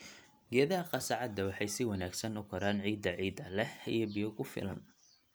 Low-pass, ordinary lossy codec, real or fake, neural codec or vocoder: none; none; real; none